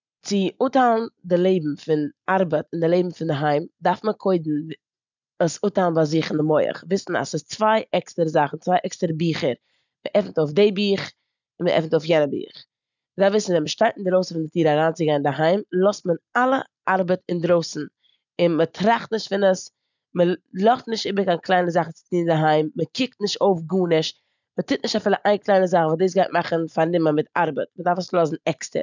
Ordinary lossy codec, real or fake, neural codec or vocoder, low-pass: none; real; none; 7.2 kHz